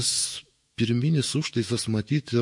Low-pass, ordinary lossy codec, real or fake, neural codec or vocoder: 10.8 kHz; MP3, 48 kbps; fake; autoencoder, 48 kHz, 128 numbers a frame, DAC-VAE, trained on Japanese speech